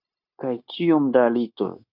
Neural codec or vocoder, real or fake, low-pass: codec, 16 kHz, 0.9 kbps, LongCat-Audio-Codec; fake; 5.4 kHz